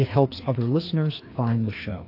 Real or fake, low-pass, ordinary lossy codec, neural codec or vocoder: fake; 5.4 kHz; AAC, 32 kbps; codec, 16 kHz, 2 kbps, FreqCodec, larger model